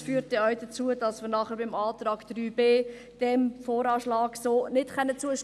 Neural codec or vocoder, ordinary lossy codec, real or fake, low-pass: none; none; real; none